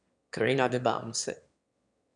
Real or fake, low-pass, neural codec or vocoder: fake; 9.9 kHz; autoencoder, 22.05 kHz, a latent of 192 numbers a frame, VITS, trained on one speaker